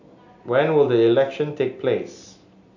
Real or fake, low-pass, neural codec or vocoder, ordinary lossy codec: real; 7.2 kHz; none; none